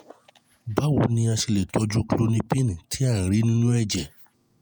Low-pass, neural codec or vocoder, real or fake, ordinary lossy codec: none; vocoder, 48 kHz, 128 mel bands, Vocos; fake; none